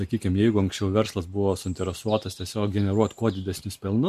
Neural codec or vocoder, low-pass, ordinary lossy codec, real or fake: none; 14.4 kHz; MP3, 64 kbps; real